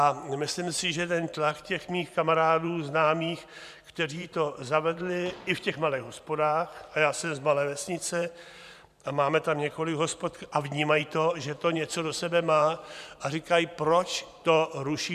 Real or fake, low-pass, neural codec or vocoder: fake; 14.4 kHz; vocoder, 44.1 kHz, 128 mel bands every 512 samples, BigVGAN v2